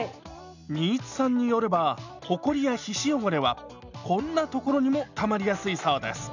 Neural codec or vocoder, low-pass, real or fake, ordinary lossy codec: none; 7.2 kHz; real; none